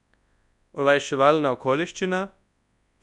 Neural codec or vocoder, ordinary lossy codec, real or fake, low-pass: codec, 24 kHz, 0.9 kbps, WavTokenizer, large speech release; none; fake; 10.8 kHz